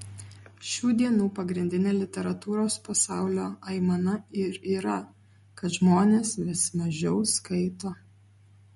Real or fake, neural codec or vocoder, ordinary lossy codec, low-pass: real; none; MP3, 48 kbps; 19.8 kHz